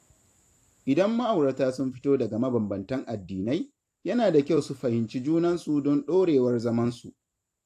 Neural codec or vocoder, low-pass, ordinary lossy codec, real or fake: vocoder, 44.1 kHz, 128 mel bands every 256 samples, BigVGAN v2; 14.4 kHz; AAC, 64 kbps; fake